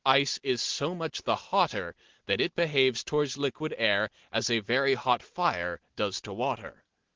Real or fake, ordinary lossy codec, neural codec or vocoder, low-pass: real; Opus, 16 kbps; none; 7.2 kHz